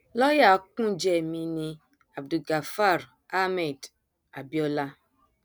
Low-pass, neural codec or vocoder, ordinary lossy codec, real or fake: none; none; none; real